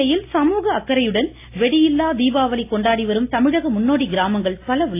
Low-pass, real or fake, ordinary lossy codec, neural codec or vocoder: 3.6 kHz; real; AAC, 24 kbps; none